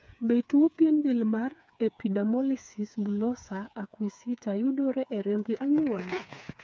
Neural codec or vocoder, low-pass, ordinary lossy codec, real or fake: codec, 16 kHz, 4 kbps, FreqCodec, smaller model; none; none; fake